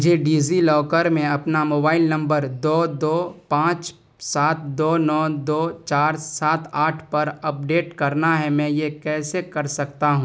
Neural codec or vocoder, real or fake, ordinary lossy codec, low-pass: none; real; none; none